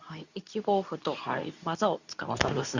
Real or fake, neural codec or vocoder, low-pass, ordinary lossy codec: fake; codec, 24 kHz, 0.9 kbps, WavTokenizer, medium speech release version 2; 7.2 kHz; none